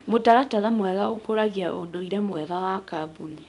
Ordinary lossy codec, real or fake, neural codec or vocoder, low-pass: none; fake; codec, 24 kHz, 0.9 kbps, WavTokenizer, small release; 10.8 kHz